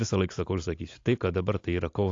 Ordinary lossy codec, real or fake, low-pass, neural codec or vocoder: AAC, 48 kbps; real; 7.2 kHz; none